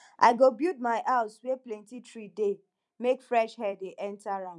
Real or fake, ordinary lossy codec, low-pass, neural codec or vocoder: fake; none; 10.8 kHz; vocoder, 44.1 kHz, 128 mel bands every 256 samples, BigVGAN v2